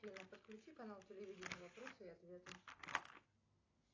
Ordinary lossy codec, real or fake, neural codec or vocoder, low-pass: AAC, 32 kbps; real; none; 7.2 kHz